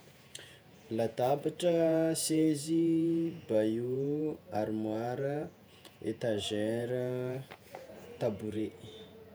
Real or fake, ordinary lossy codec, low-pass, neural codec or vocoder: fake; none; none; vocoder, 48 kHz, 128 mel bands, Vocos